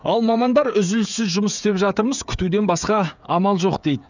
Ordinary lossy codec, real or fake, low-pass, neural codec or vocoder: none; fake; 7.2 kHz; codec, 16 kHz, 8 kbps, FreqCodec, smaller model